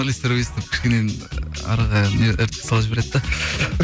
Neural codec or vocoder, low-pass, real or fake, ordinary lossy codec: none; none; real; none